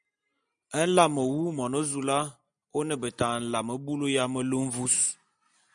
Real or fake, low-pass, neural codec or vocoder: real; 9.9 kHz; none